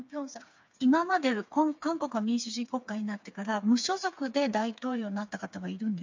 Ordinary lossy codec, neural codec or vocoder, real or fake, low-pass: MP3, 64 kbps; codec, 16 kHz, 4 kbps, FreqCodec, smaller model; fake; 7.2 kHz